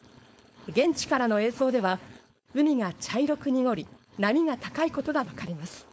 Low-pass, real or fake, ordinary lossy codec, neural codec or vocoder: none; fake; none; codec, 16 kHz, 4.8 kbps, FACodec